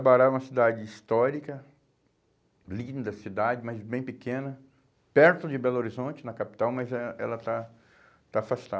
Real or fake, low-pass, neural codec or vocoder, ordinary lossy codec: real; none; none; none